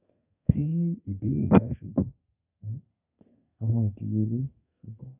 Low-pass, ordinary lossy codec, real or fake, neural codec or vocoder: 3.6 kHz; none; fake; codec, 32 kHz, 1.9 kbps, SNAC